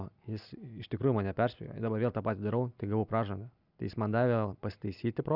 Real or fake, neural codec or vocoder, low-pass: real; none; 5.4 kHz